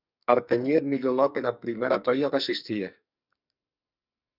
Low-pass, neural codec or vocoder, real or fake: 5.4 kHz; codec, 44.1 kHz, 2.6 kbps, SNAC; fake